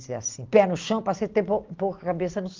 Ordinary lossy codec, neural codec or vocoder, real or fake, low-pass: Opus, 24 kbps; none; real; 7.2 kHz